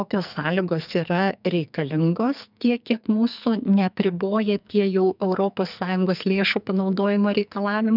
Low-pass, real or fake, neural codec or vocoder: 5.4 kHz; fake; codec, 44.1 kHz, 2.6 kbps, SNAC